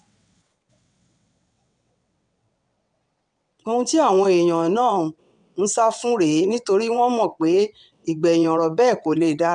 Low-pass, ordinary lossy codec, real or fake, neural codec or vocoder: 9.9 kHz; MP3, 96 kbps; fake; vocoder, 22.05 kHz, 80 mel bands, WaveNeXt